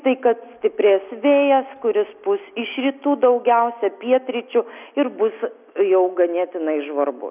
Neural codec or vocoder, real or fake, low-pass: none; real; 3.6 kHz